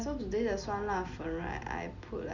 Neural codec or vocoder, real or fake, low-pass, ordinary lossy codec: none; real; 7.2 kHz; Opus, 64 kbps